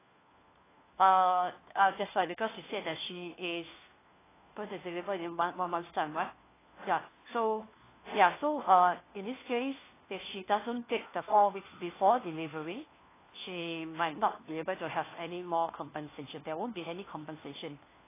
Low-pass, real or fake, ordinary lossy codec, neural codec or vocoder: 3.6 kHz; fake; AAC, 16 kbps; codec, 16 kHz, 1 kbps, FunCodec, trained on LibriTTS, 50 frames a second